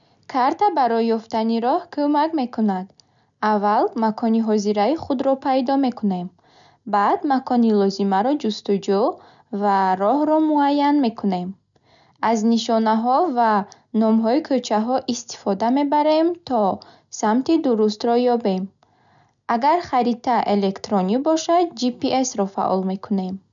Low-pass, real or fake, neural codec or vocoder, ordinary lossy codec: 7.2 kHz; real; none; none